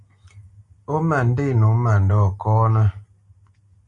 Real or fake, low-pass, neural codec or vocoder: real; 10.8 kHz; none